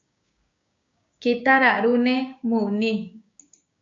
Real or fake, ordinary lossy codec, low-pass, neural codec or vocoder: fake; MP3, 48 kbps; 7.2 kHz; codec, 16 kHz, 6 kbps, DAC